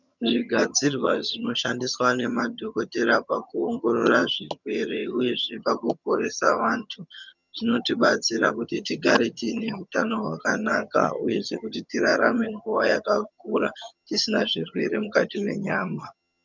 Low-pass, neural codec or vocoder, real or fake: 7.2 kHz; vocoder, 22.05 kHz, 80 mel bands, HiFi-GAN; fake